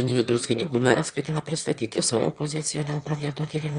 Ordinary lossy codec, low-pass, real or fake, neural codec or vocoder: Opus, 64 kbps; 9.9 kHz; fake; autoencoder, 22.05 kHz, a latent of 192 numbers a frame, VITS, trained on one speaker